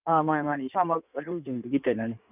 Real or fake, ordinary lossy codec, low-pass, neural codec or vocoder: fake; none; 3.6 kHz; codec, 16 kHz in and 24 kHz out, 1.1 kbps, FireRedTTS-2 codec